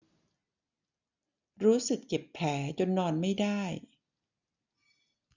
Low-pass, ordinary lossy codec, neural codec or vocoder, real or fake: 7.2 kHz; none; none; real